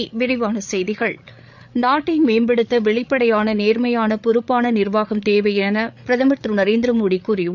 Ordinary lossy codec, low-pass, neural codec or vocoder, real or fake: none; 7.2 kHz; codec, 16 kHz, 8 kbps, FreqCodec, larger model; fake